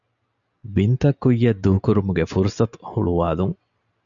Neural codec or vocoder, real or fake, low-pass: none; real; 7.2 kHz